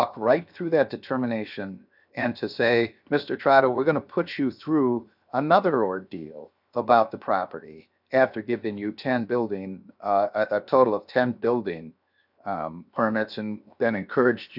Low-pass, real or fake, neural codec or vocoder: 5.4 kHz; fake; codec, 16 kHz, 0.7 kbps, FocalCodec